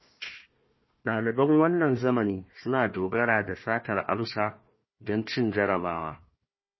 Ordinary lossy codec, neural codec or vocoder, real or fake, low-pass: MP3, 24 kbps; codec, 16 kHz, 1 kbps, FunCodec, trained on Chinese and English, 50 frames a second; fake; 7.2 kHz